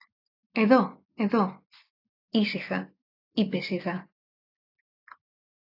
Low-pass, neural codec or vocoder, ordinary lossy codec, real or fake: 5.4 kHz; none; AAC, 32 kbps; real